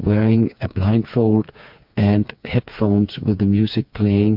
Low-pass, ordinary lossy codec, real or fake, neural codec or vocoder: 5.4 kHz; MP3, 48 kbps; fake; codec, 16 kHz, 4 kbps, FreqCodec, smaller model